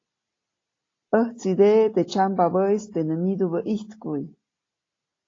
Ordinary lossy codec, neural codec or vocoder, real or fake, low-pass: AAC, 32 kbps; none; real; 7.2 kHz